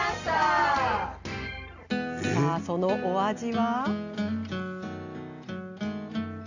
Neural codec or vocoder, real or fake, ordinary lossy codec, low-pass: none; real; Opus, 64 kbps; 7.2 kHz